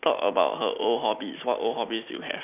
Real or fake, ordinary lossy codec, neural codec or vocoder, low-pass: real; none; none; 3.6 kHz